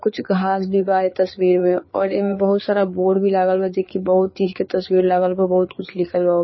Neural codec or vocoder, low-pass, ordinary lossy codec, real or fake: codec, 16 kHz, 4 kbps, FreqCodec, larger model; 7.2 kHz; MP3, 24 kbps; fake